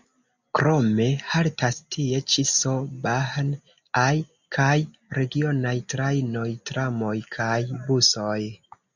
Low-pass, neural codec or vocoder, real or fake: 7.2 kHz; none; real